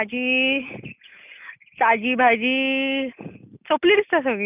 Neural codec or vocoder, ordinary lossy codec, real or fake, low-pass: none; none; real; 3.6 kHz